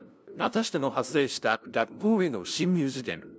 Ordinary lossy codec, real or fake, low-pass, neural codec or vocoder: none; fake; none; codec, 16 kHz, 0.5 kbps, FunCodec, trained on LibriTTS, 25 frames a second